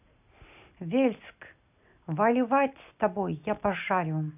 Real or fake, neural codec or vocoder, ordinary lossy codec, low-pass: real; none; none; 3.6 kHz